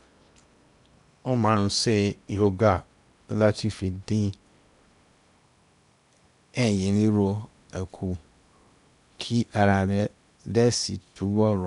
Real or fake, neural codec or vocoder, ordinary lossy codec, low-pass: fake; codec, 16 kHz in and 24 kHz out, 0.8 kbps, FocalCodec, streaming, 65536 codes; none; 10.8 kHz